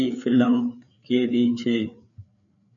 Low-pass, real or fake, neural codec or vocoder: 7.2 kHz; fake; codec, 16 kHz, 4 kbps, FreqCodec, larger model